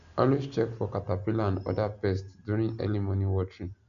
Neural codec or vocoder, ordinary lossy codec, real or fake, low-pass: none; none; real; 7.2 kHz